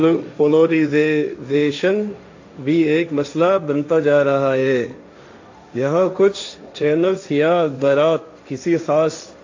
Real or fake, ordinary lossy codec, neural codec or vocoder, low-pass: fake; AAC, 48 kbps; codec, 16 kHz, 1.1 kbps, Voila-Tokenizer; 7.2 kHz